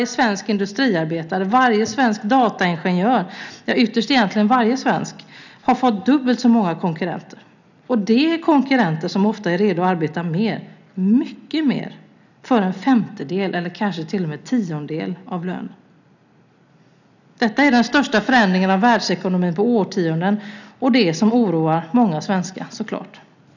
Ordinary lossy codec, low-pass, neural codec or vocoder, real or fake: none; 7.2 kHz; none; real